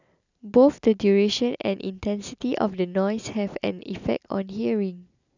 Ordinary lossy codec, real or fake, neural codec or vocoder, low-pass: none; real; none; 7.2 kHz